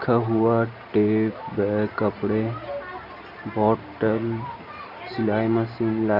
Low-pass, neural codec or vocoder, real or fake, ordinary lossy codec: 5.4 kHz; none; real; none